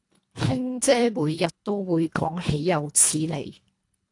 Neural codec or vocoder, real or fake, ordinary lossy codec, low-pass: codec, 24 kHz, 1.5 kbps, HILCodec; fake; AAC, 48 kbps; 10.8 kHz